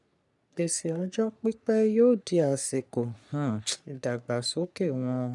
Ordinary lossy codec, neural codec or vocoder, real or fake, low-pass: none; codec, 44.1 kHz, 3.4 kbps, Pupu-Codec; fake; 10.8 kHz